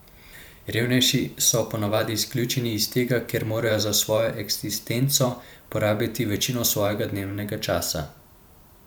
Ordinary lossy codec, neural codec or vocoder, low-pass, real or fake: none; vocoder, 44.1 kHz, 128 mel bands every 512 samples, BigVGAN v2; none; fake